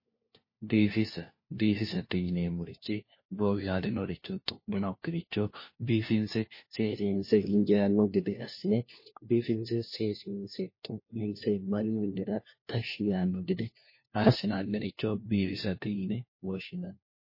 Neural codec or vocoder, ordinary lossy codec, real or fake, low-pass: codec, 16 kHz, 1 kbps, FunCodec, trained on LibriTTS, 50 frames a second; MP3, 24 kbps; fake; 5.4 kHz